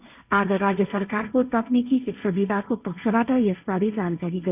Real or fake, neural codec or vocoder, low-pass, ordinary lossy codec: fake; codec, 16 kHz, 1.1 kbps, Voila-Tokenizer; 3.6 kHz; none